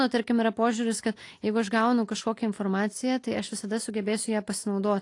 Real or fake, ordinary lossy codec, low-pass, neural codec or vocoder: real; AAC, 48 kbps; 10.8 kHz; none